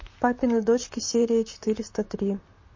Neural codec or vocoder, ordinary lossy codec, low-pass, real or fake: vocoder, 44.1 kHz, 128 mel bands, Pupu-Vocoder; MP3, 32 kbps; 7.2 kHz; fake